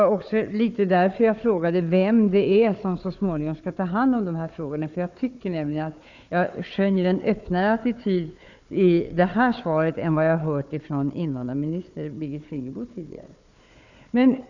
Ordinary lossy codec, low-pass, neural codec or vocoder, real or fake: none; 7.2 kHz; codec, 16 kHz, 4 kbps, FunCodec, trained on Chinese and English, 50 frames a second; fake